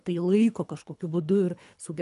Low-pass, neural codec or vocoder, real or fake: 10.8 kHz; codec, 24 kHz, 3 kbps, HILCodec; fake